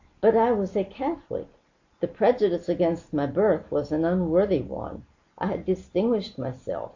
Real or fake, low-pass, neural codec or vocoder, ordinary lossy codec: real; 7.2 kHz; none; AAC, 48 kbps